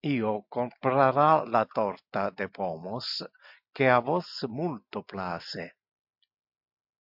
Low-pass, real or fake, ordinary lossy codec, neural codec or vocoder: 5.4 kHz; real; MP3, 48 kbps; none